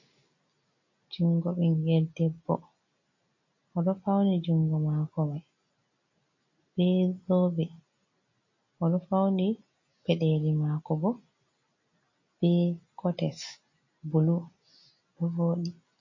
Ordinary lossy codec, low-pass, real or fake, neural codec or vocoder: MP3, 32 kbps; 7.2 kHz; real; none